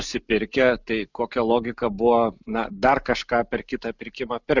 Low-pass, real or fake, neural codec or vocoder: 7.2 kHz; real; none